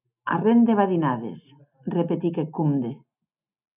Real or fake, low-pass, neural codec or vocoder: real; 3.6 kHz; none